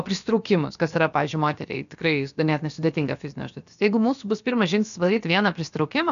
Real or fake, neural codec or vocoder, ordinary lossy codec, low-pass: fake; codec, 16 kHz, about 1 kbps, DyCAST, with the encoder's durations; MP3, 96 kbps; 7.2 kHz